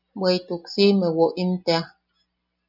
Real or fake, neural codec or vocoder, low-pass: real; none; 5.4 kHz